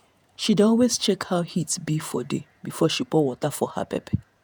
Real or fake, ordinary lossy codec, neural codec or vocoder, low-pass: fake; none; vocoder, 48 kHz, 128 mel bands, Vocos; none